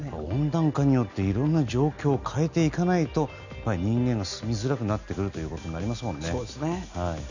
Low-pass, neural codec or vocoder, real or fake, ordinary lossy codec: 7.2 kHz; none; real; none